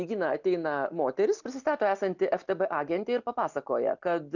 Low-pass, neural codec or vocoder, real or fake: 7.2 kHz; none; real